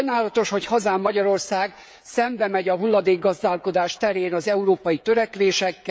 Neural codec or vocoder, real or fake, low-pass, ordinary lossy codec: codec, 16 kHz, 16 kbps, FreqCodec, smaller model; fake; none; none